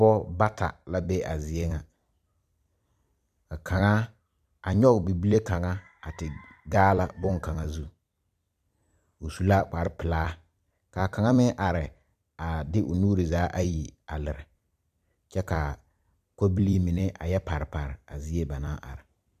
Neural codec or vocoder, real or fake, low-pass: vocoder, 44.1 kHz, 128 mel bands every 256 samples, BigVGAN v2; fake; 14.4 kHz